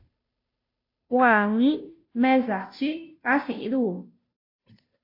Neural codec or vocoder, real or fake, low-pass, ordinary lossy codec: codec, 16 kHz, 0.5 kbps, FunCodec, trained on Chinese and English, 25 frames a second; fake; 5.4 kHz; AAC, 32 kbps